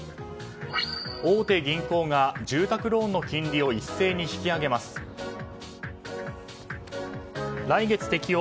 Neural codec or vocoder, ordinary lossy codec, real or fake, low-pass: none; none; real; none